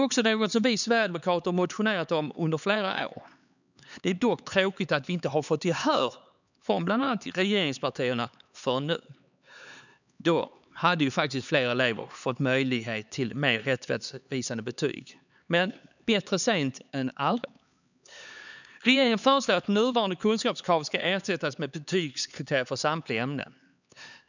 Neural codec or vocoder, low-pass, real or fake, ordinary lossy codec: codec, 16 kHz, 4 kbps, X-Codec, HuBERT features, trained on LibriSpeech; 7.2 kHz; fake; none